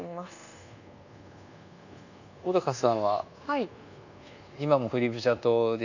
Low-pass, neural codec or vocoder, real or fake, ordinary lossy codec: 7.2 kHz; codec, 24 kHz, 1.2 kbps, DualCodec; fake; none